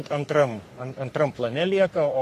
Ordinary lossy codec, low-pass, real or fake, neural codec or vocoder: AAC, 48 kbps; 14.4 kHz; fake; codec, 44.1 kHz, 3.4 kbps, Pupu-Codec